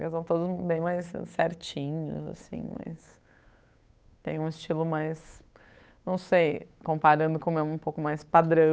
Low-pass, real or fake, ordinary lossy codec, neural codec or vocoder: none; fake; none; codec, 16 kHz, 8 kbps, FunCodec, trained on Chinese and English, 25 frames a second